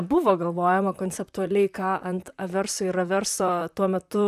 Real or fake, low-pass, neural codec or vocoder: fake; 14.4 kHz; vocoder, 44.1 kHz, 128 mel bands, Pupu-Vocoder